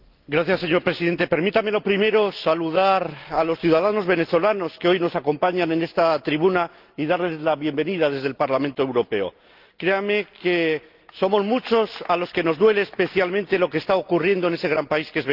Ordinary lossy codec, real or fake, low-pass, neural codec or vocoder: Opus, 32 kbps; real; 5.4 kHz; none